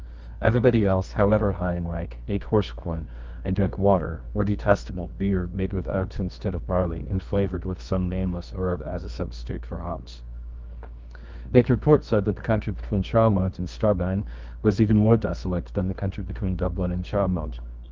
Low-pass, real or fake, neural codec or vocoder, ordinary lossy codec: 7.2 kHz; fake; codec, 24 kHz, 0.9 kbps, WavTokenizer, medium music audio release; Opus, 24 kbps